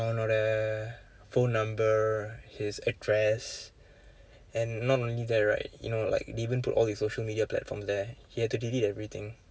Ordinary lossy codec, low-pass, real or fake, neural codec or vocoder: none; none; real; none